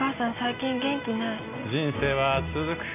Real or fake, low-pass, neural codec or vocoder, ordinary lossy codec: real; 3.6 kHz; none; none